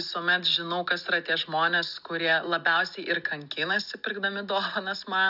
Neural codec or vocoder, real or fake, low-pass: none; real; 5.4 kHz